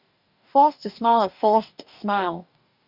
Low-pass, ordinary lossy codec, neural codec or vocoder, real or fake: 5.4 kHz; none; codec, 44.1 kHz, 2.6 kbps, DAC; fake